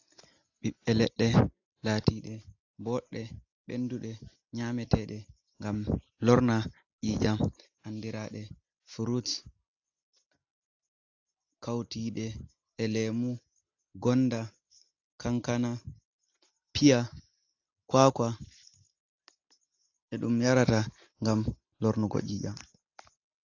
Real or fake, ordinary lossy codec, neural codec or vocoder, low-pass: real; AAC, 48 kbps; none; 7.2 kHz